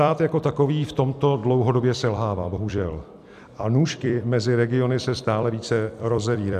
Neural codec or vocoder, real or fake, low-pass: vocoder, 44.1 kHz, 128 mel bands every 256 samples, BigVGAN v2; fake; 14.4 kHz